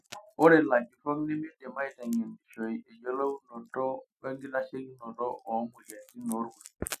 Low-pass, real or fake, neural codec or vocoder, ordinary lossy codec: 14.4 kHz; real; none; none